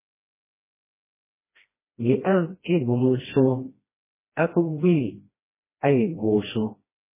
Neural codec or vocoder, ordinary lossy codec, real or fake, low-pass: codec, 16 kHz, 1 kbps, FreqCodec, smaller model; MP3, 16 kbps; fake; 3.6 kHz